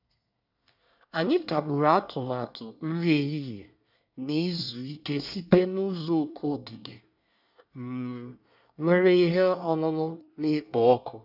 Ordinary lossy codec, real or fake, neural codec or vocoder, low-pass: none; fake; codec, 24 kHz, 1 kbps, SNAC; 5.4 kHz